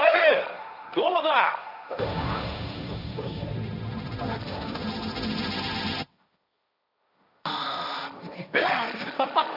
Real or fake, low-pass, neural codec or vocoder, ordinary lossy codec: fake; 5.4 kHz; codec, 16 kHz, 1.1 kbps, Voila-Tokenizer; none